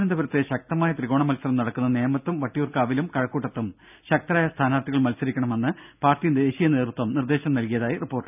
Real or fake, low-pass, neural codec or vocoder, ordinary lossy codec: real; 3.6 kHz; none; none